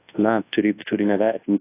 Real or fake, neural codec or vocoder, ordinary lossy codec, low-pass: fake; codec, 24 kHz, 0.9 kbps, WavTokenizer, large speech release; AAC, 24 kbps; 3.6 kHz